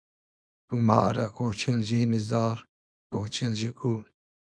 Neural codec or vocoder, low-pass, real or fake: codec, 24 kHz, 0.9 kbps, WavTokenizer, small release; 9.9 kHz; fake